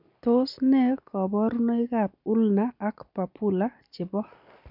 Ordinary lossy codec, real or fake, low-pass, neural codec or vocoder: none; real; 5.4 kHz; none